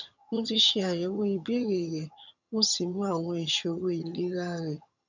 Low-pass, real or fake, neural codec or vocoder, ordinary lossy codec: 7.2 kHz; fake; vocoder, 22.05 kHz, 80 mel bands, HiFi-GAN; none